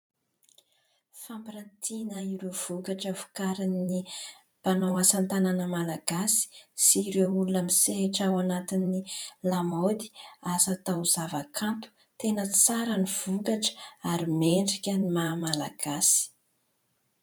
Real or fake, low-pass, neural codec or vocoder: fake; 19.8 kHz; vocoder, 44.1 kHz, 128 mel bands every 512 samples, BigVGAN v2